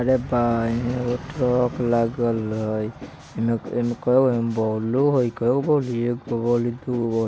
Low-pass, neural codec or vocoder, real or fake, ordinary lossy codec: none; none; real; none